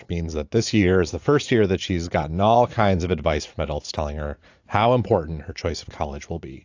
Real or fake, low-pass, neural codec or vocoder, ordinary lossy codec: fake; 7.2 kHz; vocoder, 44.1 kHz, 80 mel bands, Vocos; AAC, 48 kbps